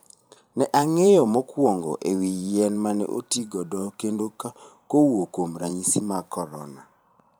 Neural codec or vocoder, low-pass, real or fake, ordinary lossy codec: vocoder, 44.1 kHz, 128 mel bands every 512 samples, BigVGAN v2; none; fake; none